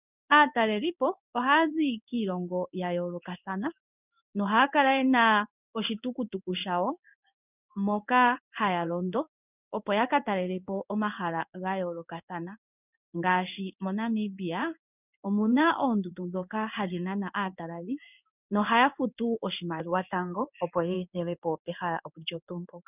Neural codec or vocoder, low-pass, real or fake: codec, 16 kHz in and 24 kHz out, 1 kbps, XY-Tokenizer; 3.6 kHz; fake